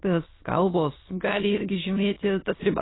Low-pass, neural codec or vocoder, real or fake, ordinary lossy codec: 7.2 kHz; autoencoder, 22.05 kHz, a latent of 192 numbers a frame, VITS, trained on many speakers; fake; AAC, 16 kbps